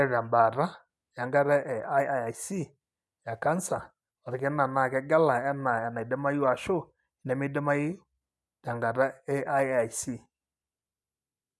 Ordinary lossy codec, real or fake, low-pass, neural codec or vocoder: none; real; none; none